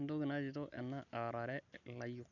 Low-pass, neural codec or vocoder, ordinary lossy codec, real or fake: 7.2 kHz; none; none; real